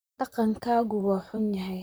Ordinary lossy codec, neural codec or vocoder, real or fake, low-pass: none; vocoder, 44.1 kHz, 128 mel bands, Pupu-Vocoder; fake; none